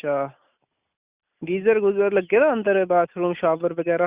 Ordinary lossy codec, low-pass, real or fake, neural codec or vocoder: Opus, 64 kbps; 3.6 kHz; real; none